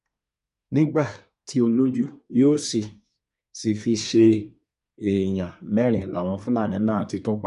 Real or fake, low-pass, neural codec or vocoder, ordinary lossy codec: fake; 10.8 kHz; codec, 24 kHz, 1 kbps, SNAC; none